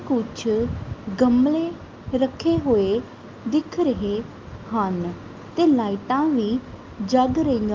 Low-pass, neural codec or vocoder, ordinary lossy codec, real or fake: 7.2 kHz; none; Opus, 32 kbps; real